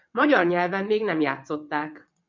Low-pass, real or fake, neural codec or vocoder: 7.2 kHz; fake; vocoder, 22.05 kHz, 80 mel bands, WaveNeXt